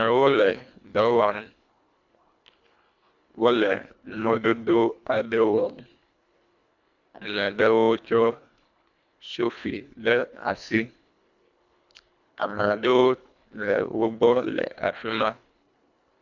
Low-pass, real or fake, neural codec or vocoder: 7.2 kHz; fake; codec, 24 kHz, 1.5 kbps, HILCodec